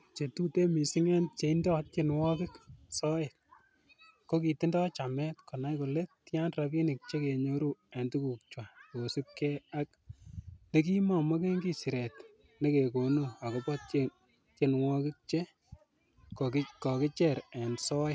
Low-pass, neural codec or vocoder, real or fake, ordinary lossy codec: none; none; real; none